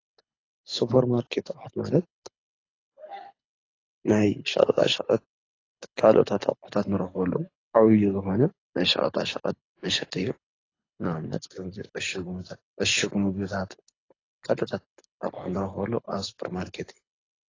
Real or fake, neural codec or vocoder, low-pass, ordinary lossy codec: fake; codec, 24 kHz, 6 kbps, HILCodec; 7.2 kHz; AAC, 32 kbps